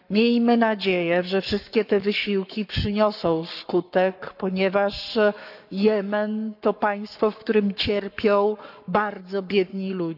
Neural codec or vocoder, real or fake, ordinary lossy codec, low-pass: codec, 44.1 kHz, 7.8 kbps, Pupu-Codec; fake; none; 5.4 kHz